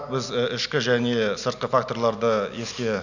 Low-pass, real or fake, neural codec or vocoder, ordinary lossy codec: 7.2 kHz; real; none; none